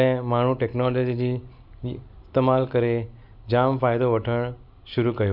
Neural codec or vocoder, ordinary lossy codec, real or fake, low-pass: none; none; real; 5.4 kHz